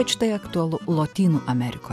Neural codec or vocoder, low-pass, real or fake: none; 14.4 kHz; real